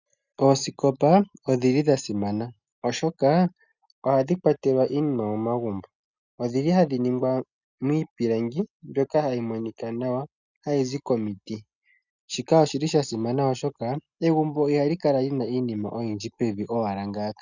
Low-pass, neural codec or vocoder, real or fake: 7.2 kHz; none; real